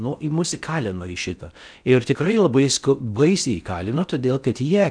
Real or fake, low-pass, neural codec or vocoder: fake; 9.9 kHz; codec, 16 kHz in and 24 kHz out, 0.6 kbps, FocalCodec, streaming, 4096 codes